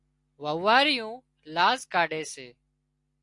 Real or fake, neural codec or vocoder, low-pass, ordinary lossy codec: real; none; 10.8 kHz; AAC, 64 kbps